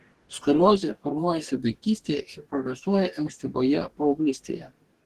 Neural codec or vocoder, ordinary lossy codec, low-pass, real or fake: codec, 44.1 kHz, 2.6 kbps, DAC; Opus, 16 kbps; 14.4 kHz; fake